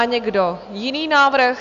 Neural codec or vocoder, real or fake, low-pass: none; real; 7.2 kHz